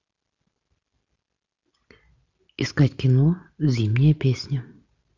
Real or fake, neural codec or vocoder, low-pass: real; none; 7.2 kHz